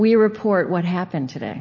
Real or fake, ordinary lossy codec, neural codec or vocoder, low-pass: real; MP3, 32 kbps; none; 7.2 kHz